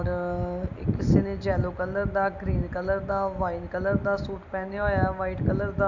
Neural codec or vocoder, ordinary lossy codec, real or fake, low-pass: none; none; real; 7.2 kHz